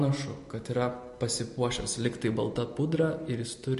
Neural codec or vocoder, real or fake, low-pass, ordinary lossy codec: none; real; 14.4 kHz; MP3, 48 kbps